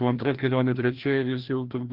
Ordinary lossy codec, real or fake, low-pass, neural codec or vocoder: Opus, 32 kbps; fake; 5.4 kHz; codec, 16 kHz, 1 kbps, FreqCodec, larger model